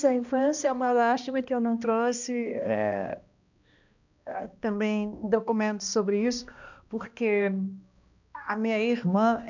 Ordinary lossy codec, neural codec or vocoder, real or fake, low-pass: none; codec, 16 kHz, 1 kbps, X-Codec, HuBERT features, trained on balanced general audio; fake; 7.2 kHz